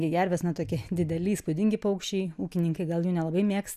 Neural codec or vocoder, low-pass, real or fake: none; 14.4 kHz; real